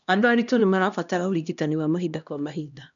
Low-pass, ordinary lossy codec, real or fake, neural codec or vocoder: 7.2 kHz; none; fake; codec, 16 kHz, 1 kbps, X-Codec, HuBERT features, trained on LibriSpeech